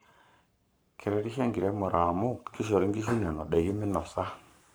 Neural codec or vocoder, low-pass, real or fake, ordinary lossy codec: codec, 44.1 kHz, 7.8 kbps, Pupu-Codec; none; fake; none